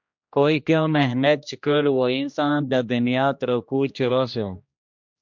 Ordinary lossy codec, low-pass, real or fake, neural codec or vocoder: MP3, 64 kbps; 7.2 kHz; fake; codec, 16 kHz, 1 kbps, X-Codec, HuBERT features, trained on general audio